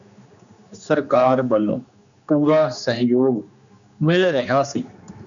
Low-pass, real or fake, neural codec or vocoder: 7.2 kHz; fake; codec, 16 kHz, 2 kbps, X-Codec, HuBERT features, trained on general audio